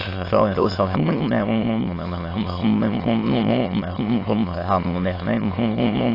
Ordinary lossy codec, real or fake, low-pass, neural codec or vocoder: AAC, 24 kbps; fake; 5.4 kHz; autoencoder, 22.05 kHz, a latent of 192 numbers a frame, VITS, trained on many speakers